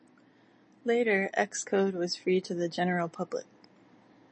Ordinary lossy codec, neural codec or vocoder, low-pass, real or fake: MP3, 32 kbps; none; 9.9 kHz; real